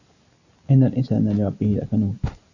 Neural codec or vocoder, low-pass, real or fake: none; 7.2 kHz; real